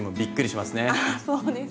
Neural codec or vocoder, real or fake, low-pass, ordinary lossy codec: none; real; none; none